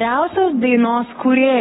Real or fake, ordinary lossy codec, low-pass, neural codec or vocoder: fake; AAC, 16 kbps; 19.8 kHz; codec, 44.1 kHz, 7.8 kbps, Pupu-Codec